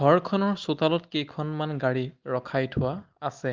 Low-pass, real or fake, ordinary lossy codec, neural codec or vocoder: 7.2 kHz; real; Opus, 32 kbps; none